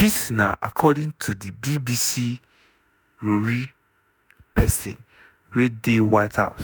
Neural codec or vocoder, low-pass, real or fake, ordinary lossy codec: autoencoder, 48 kHz, 32 numbers a frame, DAC-VAE, trained on Japanese speech; none; fake; none